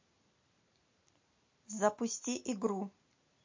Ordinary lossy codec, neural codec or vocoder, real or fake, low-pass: MP3, 32 kbps; none; real; 7.2 kHz